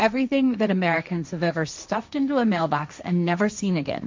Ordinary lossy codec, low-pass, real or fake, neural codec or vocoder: MP3, 64 kbps; 7.2 kHz; fake; codec, 16 kHz, 1.1 kbps, Voila-Tokenizer